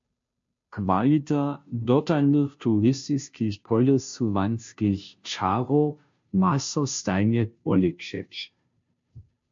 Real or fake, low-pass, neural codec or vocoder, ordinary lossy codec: fake; 7.2 kHz; codec, 16 kHz, 0.5 kbps, FunCodec, trained on Chinese and English, 25 frames a second; MP3, 48 kbps